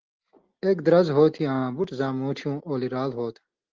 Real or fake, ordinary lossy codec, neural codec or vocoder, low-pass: real; Opus, 16 kbps; none; 7.2 kHz